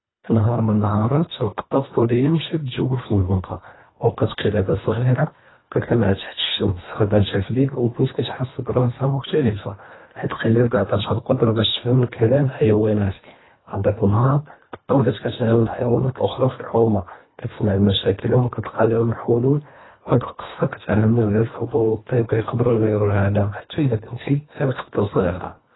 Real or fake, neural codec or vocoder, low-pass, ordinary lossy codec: fake; codec, 24 kHz, 1.5 kbps, HILCodec; 7.2 kHz; AAC, 16 kbps